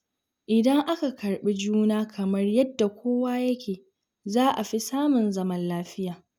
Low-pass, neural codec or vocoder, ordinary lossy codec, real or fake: 19.8 kHz; none; none; real